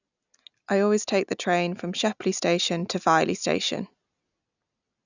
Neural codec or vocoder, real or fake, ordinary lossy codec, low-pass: none; real; none; 7.2 kHz